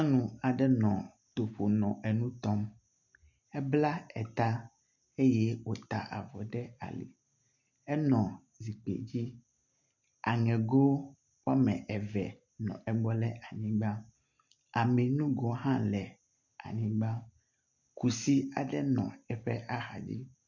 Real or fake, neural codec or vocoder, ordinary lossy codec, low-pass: real; none; MP3, 64 kbps; 7.2 kHz